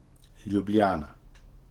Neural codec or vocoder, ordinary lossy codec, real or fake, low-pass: codec, 44.1 kHz, 7.8 kbps, DAC; Opus, 16 kbps; fake; 19.8 kHz